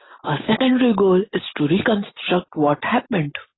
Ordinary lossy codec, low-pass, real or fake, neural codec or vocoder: AAC, 16 kbps; 7.2 kHz; real; none